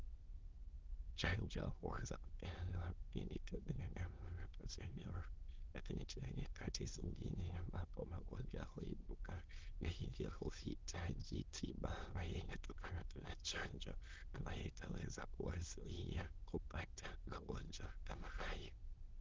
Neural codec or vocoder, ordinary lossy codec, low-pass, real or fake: autoencoder, 22.05 kHz, a latent of 192 numbers a frame, VITS, trained on many speakers; Opus, 16 kbps; 7.2 kHz; fake